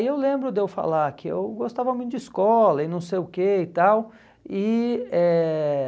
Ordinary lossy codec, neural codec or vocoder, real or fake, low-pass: none; none; real; none